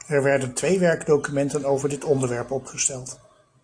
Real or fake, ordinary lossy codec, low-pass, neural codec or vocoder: real; AAC, 48 kbps; 9.9 kHz; none